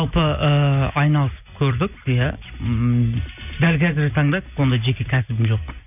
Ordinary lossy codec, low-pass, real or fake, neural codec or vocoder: none; 3.6 kHz; real; none